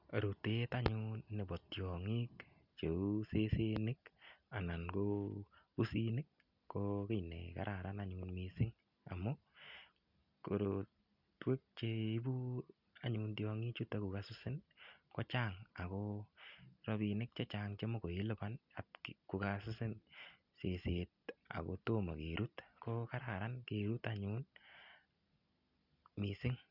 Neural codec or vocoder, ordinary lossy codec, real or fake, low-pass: none; none; real; 5.4 kHz